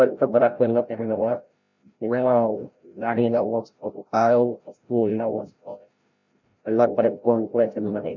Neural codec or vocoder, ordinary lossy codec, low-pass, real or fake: codec, 16 kHz, 0.5 kbps, FreqCodec, larger model; none; 7.2 kHz; fake